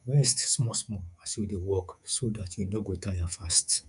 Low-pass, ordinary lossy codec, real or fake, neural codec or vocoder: 10.8 kHz; none; fake; codec, 24 kHz, 3.1 kbps, DualCodec